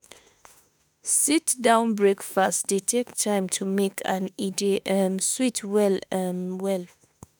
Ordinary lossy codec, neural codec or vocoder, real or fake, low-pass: none; autoencoder, 48 kHz, 32 numbers a frame, DAC-VAE, trained on Japanese speech; fake; none